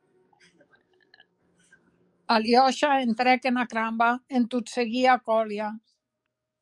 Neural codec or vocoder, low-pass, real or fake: codec, 44.1 kHz, 7.8 kbps, DAC; 10.8 kHz; fake